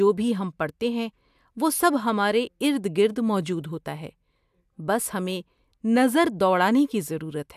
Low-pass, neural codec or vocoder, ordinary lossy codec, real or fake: 14.4 kHz; none; AAC, 96 kbps; real